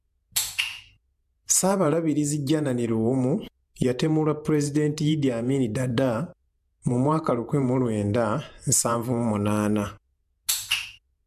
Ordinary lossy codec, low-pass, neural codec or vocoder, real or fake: AAC, 96 kbps; 14.4 kHz; vocoder, 48 kHz, 128 mel bands, Vocos; fake